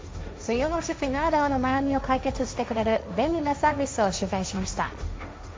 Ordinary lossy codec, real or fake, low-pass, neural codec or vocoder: none; fake; none; codec, 16 kHz, 1.1 kbps, Voila-Tokenizer